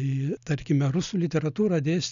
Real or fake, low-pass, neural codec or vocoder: real; 7.2 kHz; none